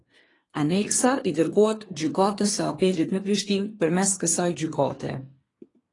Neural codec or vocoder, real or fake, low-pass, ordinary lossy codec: codec, 24 kHz, 1 kbps, SNAC; fake; 10.8 kHz; AAC, 32 kbps